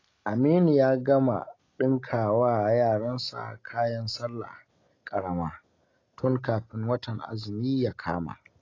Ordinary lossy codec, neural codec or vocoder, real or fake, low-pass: AAC, 32 kbps; none; real; 7.2 kHz